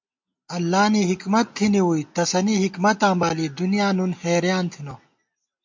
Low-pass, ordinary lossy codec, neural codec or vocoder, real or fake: 7.2 kHz; MP3, 48 kbps; none; real